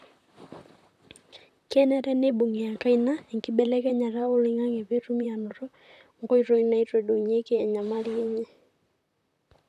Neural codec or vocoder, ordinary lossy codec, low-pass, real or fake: vocoder, 44.1 kHz, 128 mel bands, Pupu-Vocoder; none; 14.4 kHz; fake